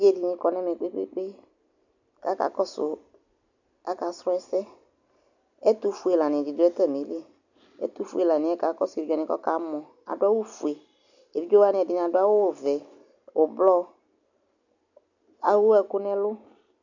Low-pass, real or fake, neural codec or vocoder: 7.2 kHz; real; none